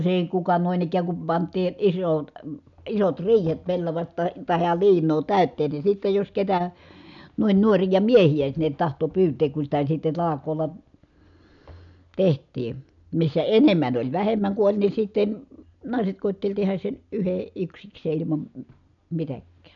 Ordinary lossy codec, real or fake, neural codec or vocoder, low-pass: none; real; none; 7.2 kHz